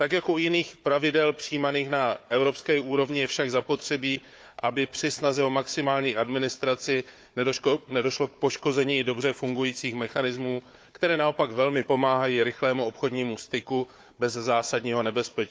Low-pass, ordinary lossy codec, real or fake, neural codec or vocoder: none; none; fake; codec, 16 kHz, 4 kbps, FunCodec, trained on Chinese and English, 50 frames a second